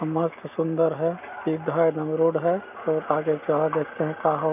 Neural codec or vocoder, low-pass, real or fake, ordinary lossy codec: none; 3.6 kHz; real; none